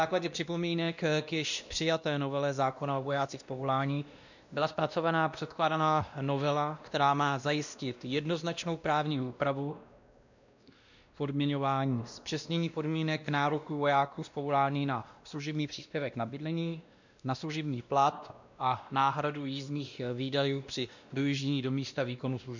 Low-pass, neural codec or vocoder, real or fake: 7.2 kHz; codec, 16 kHz, 1 kbps, X-Codec, WavLM features, trained on Multilingual LibriSpeech; fake